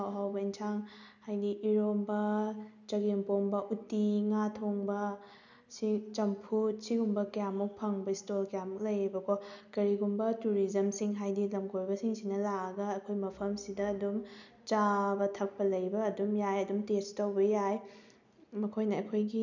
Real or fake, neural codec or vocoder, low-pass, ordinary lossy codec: real; none; 7.2 kHz; none